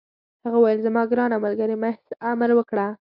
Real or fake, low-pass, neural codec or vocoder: real; 5.4 kHz; none